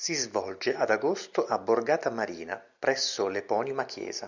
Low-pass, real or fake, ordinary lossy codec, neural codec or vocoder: 7.2 kHz; real; Opus, 64 kbps; none